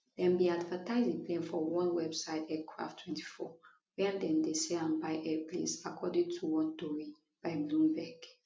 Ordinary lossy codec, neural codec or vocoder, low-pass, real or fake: none; none; none; real